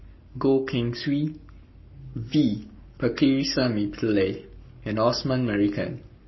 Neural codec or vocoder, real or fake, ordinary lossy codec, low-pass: codec, 44.1 kHz, 7.8 kbps, Pupu-Codec; fake; MP3, 24 kbps; 7.2 kHz